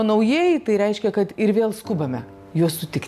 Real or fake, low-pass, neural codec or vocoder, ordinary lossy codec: real; 14.4 kHz; none; AAC, 96 kbps